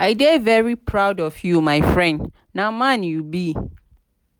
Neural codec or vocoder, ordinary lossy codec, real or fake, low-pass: none; none; real; none